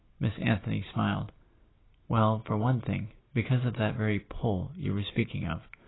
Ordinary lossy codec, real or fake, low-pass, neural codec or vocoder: AAC, 16 kbps; real; 7.2 kHz; none